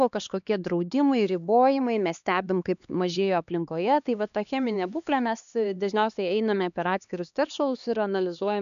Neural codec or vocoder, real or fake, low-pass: codec, 16 kHz, 2 kbps, X-Codec, HuBERT features, trained on LibriSpeech; fake; 7.2 kHz